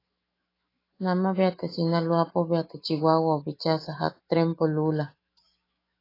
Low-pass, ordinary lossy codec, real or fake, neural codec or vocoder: 5.4 kHz; AAC, 24 kbps; fake; autoencoder, 48 kHz, 128 numbers a frame, DAC-VAE, trained on Japanese speech